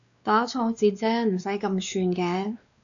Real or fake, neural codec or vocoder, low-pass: fake; codec, 16 kHz, 2 kbps, X-Codec, WavLM features, trained on Multilingual LibriSpeech; 7.2 kHz